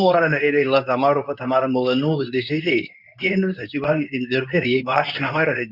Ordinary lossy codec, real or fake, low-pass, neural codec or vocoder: none; fake; 5.4 kHz; codec, 24 kHz, 0.9 kbps, WavTokenizer, medium speech release version 2